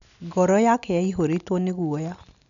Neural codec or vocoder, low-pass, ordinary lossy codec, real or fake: none; 7.2 kHz; none; real